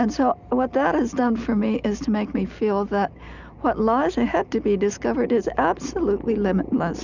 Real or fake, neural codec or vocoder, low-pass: real; none; 7.2 kHz